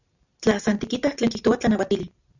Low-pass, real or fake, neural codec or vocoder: 7.2 kHz; real; none